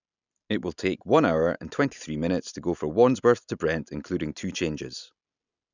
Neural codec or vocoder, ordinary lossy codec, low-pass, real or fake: none; none; 7.2 kHz; real